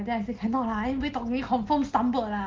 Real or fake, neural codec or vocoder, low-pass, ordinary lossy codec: real; none; 7.2 kHz; Opus, 16 kbps